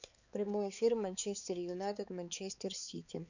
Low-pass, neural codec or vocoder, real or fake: 7.2 kHz; codec, 16 kHz, 4 kbps, X-Codec, HuBERT features, trained on balanced general audio; fake